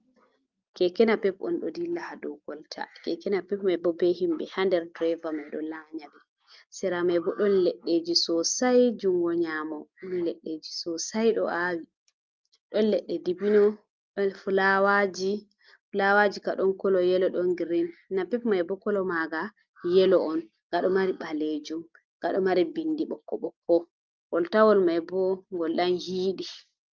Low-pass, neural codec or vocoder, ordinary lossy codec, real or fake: 7.2 kHz; none; Opus, 24 kbps; real